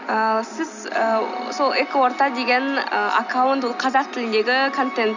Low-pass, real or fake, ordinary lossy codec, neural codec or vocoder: 7.2 kHz; real; none; none